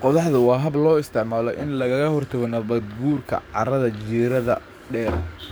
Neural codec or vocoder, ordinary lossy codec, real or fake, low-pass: codec, 44.1 kHz, 7.8 kbps, DAC; none; fake; none